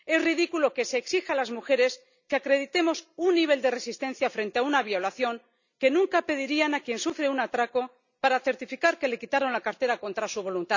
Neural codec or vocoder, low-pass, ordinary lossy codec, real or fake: none; 7.2 kHz; none; real